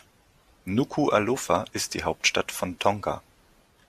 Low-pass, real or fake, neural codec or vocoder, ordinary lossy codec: 14.4 kHz; real; none; AAC, 96 kbps